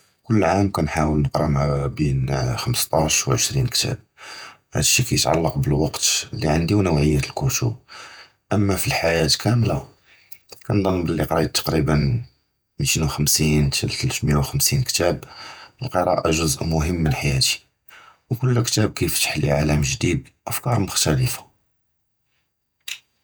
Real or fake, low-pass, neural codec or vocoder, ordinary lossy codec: fake; none; vocoder, 48 kHz, 128 mel bands, Vocos; none